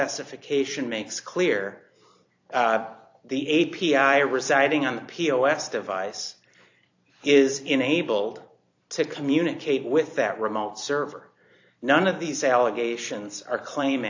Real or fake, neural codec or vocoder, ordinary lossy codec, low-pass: fake; vocoder, 44.1 kHz, 128 mel bands every 256 samples, BigVGAN v2; AAC, 48 kbps; 7.2 kHz